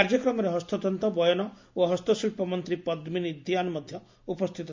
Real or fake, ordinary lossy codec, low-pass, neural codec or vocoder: real; MP3, 48 kbps; 7.2 kHz; none